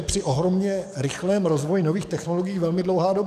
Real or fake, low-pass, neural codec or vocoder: fake; 14.4 kHz; codec, 44.1 kHz, 7.8 kbps, DAC